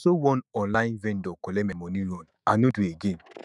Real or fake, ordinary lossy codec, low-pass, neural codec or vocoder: fake; none; 10.8 kHz; autoencoder, 48 kHz, 128 numbers a frame, DAC-VAE, trained on Japanese speech